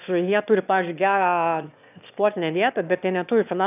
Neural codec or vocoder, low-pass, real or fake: autoencoder, 22.05 kHz, a latent of 192 numbers a frame, VITS, trained on one speaker; 3.6 kHz; fake